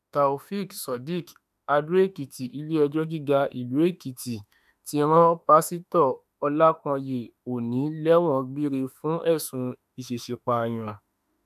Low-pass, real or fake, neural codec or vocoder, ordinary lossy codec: 14.4 kHz; fake; autoencoder, 48 kHz, 32 numbers a frame, DAC-VAE, trained on Japanese speech; none